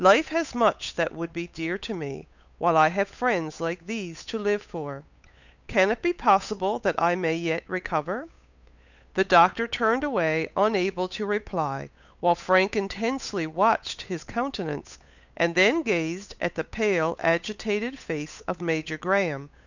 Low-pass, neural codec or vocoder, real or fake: 7.2 kHz; codec, 16 kHz, 8 kbps, FunCodec, trained on Chinese and English, 25 frames a second; fake